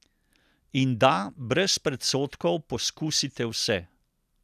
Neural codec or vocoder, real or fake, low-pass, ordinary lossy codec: none; real; 14.4 kHz; none